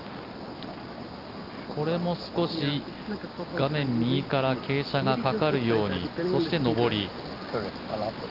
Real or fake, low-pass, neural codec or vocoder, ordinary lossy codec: real; 5.4 kHz; none; Opus, 24 kbps